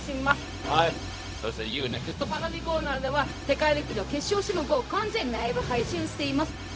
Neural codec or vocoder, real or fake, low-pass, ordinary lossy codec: codec, 16 kHz, 0.4 kbps, LongCat-Audio-Codec; fake; none; none